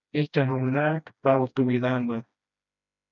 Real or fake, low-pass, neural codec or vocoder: fake; 7.2 kHz; codec, 16 kHz, 1 kbps, FreqCodec, smaller model